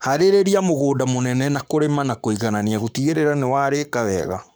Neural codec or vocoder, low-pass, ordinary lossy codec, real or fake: codec, 44.1 kHz, 7.8 kbps, Pupu-Codec; none; none; fake